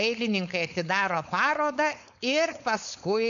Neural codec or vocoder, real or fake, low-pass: codec, 16 kHz, 4.8 kbps, FACodec; fake; 7.2 kHz